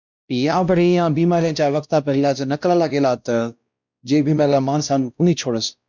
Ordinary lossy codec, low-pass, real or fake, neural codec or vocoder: MP3, 64 kbps; 7.2 kHz; fake; codec, 16 kHz, 1 kbps, X-Codec, WavLM features, trained on Multilingual LibriSpeech